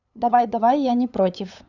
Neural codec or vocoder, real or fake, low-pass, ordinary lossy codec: codec, 16 kHz, 8 kbps, FunCodec, trained on LibriTTS, 25 frames a second; fake; 7.2 kHz; none